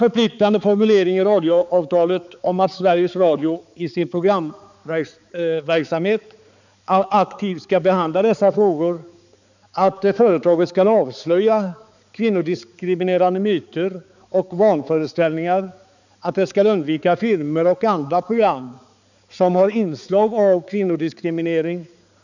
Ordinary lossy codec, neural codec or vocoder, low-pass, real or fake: none; codec, 16 kHz, 4 kbps, X-Codec, HuBERT features, trained on balanced general audio; 7.2 kHz; fake